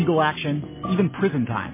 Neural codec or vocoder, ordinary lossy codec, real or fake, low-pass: none; MP3, 16 kbps; real; 3.6 kHz